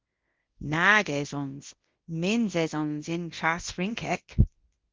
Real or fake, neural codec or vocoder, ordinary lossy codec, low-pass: fake; codec, 24 kHz, 0.9 kbps, WavTokenizer, large speech release; Opus, 16 kbps; 7.2 kHz